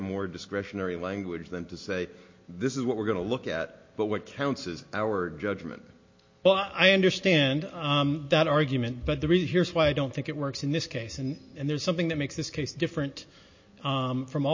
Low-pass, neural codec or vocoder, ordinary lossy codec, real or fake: 7.2 kHz; none; MP3, 32 kbps; real